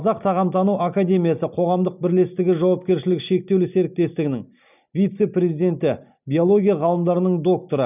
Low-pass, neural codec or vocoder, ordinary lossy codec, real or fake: 3.6 kHz; none; none; real